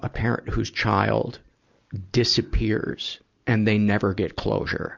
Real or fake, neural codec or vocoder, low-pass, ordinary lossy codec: real; none; 7.2 kHz; Opus, 64 kbps